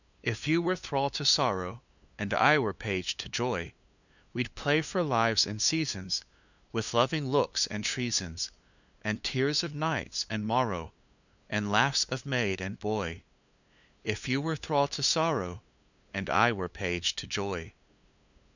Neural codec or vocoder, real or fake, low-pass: codec, 16 kHz, 2 kbps, FunCodec, trained on LibriTTS, 25 frames a second; fake; 7.2 kHz